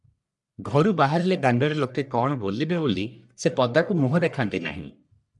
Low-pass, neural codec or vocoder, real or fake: 10.8 kHz; codec, 44.1 kHz, 1.7 kbps, Pupu-Codec; fake